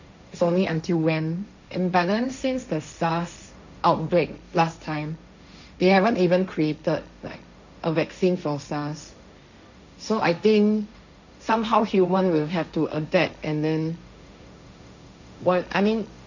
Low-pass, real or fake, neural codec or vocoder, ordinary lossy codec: 7.2 kHz; fake; codec, 16 kHz, 1.1 kbps, Voila-Tokenizer; none